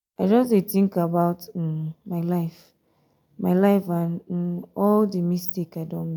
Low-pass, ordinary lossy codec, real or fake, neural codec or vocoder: 19.8 kHz; none; real; none